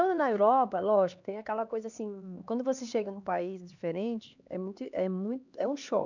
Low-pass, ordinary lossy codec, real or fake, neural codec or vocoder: 7.2 kHz; none; fake; codec, 16 kHz, 2 kbps, X-Codec, HuBERT features, trained on LibriSpeech